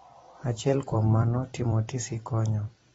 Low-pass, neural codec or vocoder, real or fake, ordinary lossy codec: 19.8 kHz; vocoder, 44.1 kHz, 128 mel bands every 256 samples, BigVGAN v2; fake; AAC, 24 kbps